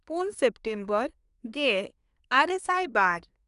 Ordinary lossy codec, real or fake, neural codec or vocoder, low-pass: none; fake; codec, 24 kHz, 1 kbps, SNAC; 10.8 kHz